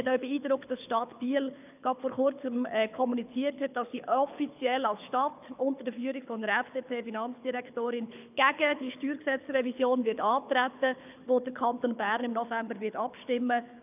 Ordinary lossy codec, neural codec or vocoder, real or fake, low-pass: none; codec, 24 kHz, 6 kbps, HILCodec; fake; 3.6 kHz